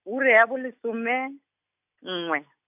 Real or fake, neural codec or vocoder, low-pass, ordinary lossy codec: real; none; 3.6 kHz; AAC, 32 kbps